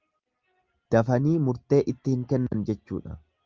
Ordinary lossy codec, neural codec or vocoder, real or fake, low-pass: Opus, 32 kbps; none; real; 7.2 kHz